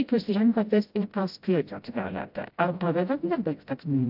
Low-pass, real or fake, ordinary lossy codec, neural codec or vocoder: 5.4 kHz; fake; AAC, 48 kbps; codec, 16 kHz, 0.5 kbps, FreqCodec, smaller model